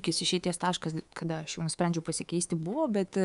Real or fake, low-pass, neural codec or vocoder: fake; 10.8 kHz; codec, 24 kHz, 3.1 kbps, DualCodec